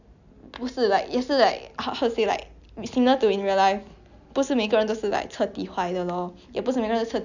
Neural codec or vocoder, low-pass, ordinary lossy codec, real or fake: none; 7.2 kHz; none; real